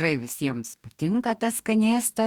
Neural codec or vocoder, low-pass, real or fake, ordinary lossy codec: codec, 44.1 kHz, 2.6 kbps, DAC; 19.8 kHz; fake; Opus, 64 kbps